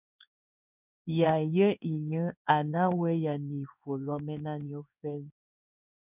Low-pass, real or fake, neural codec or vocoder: 3.6 kHz; fake; codec, 16 kHz in and 24 kHz out, 1 kbps, XY-Tokenizer